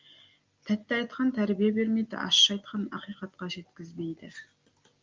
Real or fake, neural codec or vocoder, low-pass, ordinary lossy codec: real; none; 7.2 kHz; Opus, 32 kbps